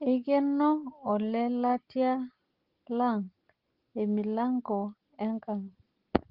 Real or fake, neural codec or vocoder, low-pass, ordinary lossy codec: real; none; 5.4 kHz; Opus, 16 kbps